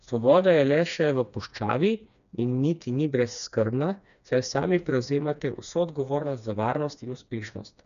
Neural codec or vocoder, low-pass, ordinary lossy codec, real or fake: codec, 16 kHz, 2 kbps, FreqCodec, smaller model; 7.2 kHz; none; fake